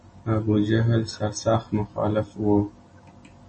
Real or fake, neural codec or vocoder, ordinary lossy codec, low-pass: real; none; MP3, 32 kbps; 10.8 kHz